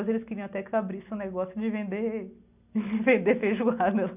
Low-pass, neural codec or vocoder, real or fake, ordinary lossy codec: 3.6 kHz; none; real; none